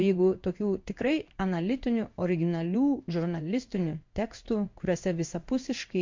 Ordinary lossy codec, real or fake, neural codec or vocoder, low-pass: MP3, 48 kbps; fake; codec, 16 kHz in and 24 kHz out, 1 kbps, XY-Tokenizer; 7.2 kHz